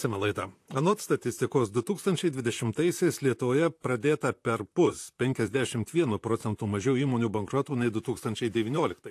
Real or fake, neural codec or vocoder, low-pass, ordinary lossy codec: fake; vocoder, 44.1 kHz, 128 mel bands, Pupu-Vocoder; 14.4 kHz; AAC, 64 kbps